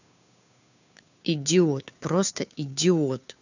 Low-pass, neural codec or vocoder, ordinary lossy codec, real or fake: 7.2 kHz; codec, 16 kHz, 2 kbps, FunCodec, trained on Chinese and English, 25 frames a second; none; fake